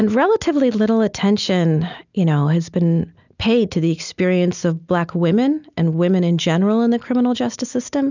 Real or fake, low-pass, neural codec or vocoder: real; 7.2 kHz; none